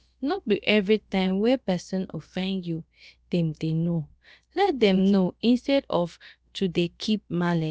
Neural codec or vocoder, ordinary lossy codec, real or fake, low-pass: codec, 16 kHz, about 1 kbps, DyCAST, with the encoder's durations; none; fake; none